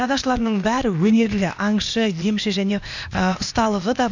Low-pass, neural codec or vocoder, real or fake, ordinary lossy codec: 7.2 kHz; codec, 16 kHz, 0.8 kbps, ZipCodec; fake; none